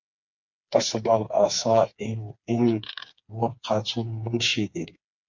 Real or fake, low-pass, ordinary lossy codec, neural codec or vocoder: fake; 7.2 kHz; MP3, 48 kbps; codec, 16 kHz, 4 kbps, FreqCodec, smaller model